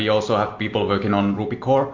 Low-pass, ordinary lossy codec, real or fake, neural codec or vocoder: 7.2 kHz; MP3, 48 kbps; real; none